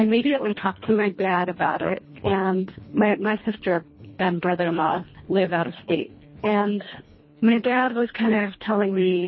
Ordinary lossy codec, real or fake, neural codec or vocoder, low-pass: MP3, 24 kbps; fake; codec, 24 kHz, 1.5 kbps, HILCodec; 7.2 kHz